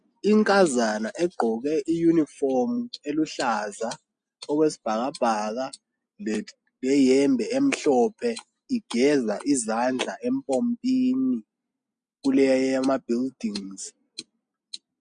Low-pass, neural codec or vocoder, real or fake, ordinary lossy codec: 9.9 kHz; none; real; MP3, 64 kbps